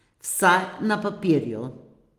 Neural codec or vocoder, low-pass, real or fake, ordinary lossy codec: none; 14.4 kHz; real; Opus, 24 kbps